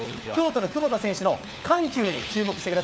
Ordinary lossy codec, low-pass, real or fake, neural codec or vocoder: none; none; fake; codec, 16 kHz, 4 kbps, FunCodec, trained on LibriTTS, 50 frames a second